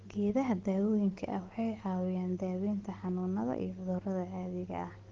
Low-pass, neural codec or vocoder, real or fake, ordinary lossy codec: 7.2 kHz; none; real; Opus, 16 kbps